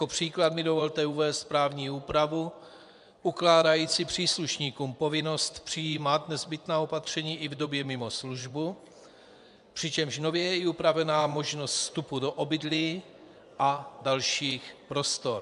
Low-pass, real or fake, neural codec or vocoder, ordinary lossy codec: 10.8 kHz; fake; vocoder, 24 kHz, 100 mel bands, Vocos; MP3, 96 kbps